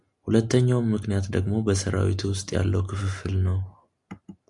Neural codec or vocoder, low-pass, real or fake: none; 10.8 kHz; real